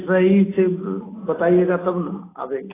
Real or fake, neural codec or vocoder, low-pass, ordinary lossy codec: real; none; 3.6 kHz; AAC, 16 kbps